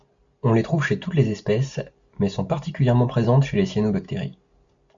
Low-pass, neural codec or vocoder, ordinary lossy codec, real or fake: 7.2 kHz; none; MP3, 64 kbps; real